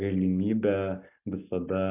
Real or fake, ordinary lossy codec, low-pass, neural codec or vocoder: real; AAC, 32 kbps; 3.6 kHz; none